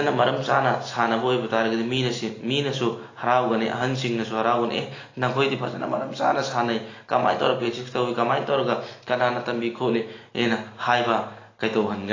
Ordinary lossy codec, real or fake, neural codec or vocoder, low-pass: AAC, 32 kbps; real; none; 7.2 kHz